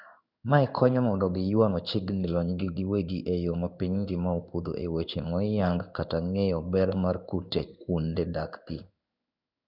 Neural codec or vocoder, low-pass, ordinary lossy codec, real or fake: codec, 16 kHz in and 24 kHz out, 1 kbps, XY-Tokenizer; 5.4 kHz; none; fake